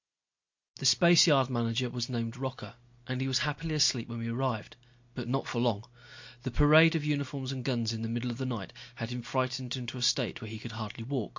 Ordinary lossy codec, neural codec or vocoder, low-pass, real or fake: MP3, 48 kbps; none; 7.2 kHz; real